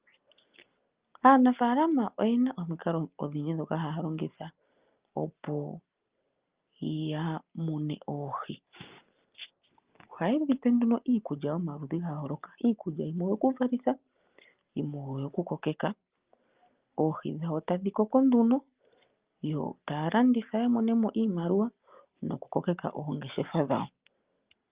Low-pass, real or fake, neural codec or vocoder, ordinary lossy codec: 3.6 kHz; real; none; Opus, 32 kbps